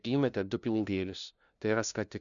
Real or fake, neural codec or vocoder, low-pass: fake; codec, 16 kHz, 0.5 kbps, FunCodec, trained on LibriTTS, 25 frames a second; 7.2 kHz